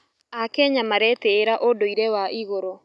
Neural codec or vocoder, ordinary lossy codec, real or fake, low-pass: none; none; real; none